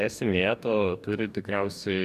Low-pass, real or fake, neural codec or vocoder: 14.4 kHz; fake; codec, 44.1 kHz, 2.6 kbps, DAC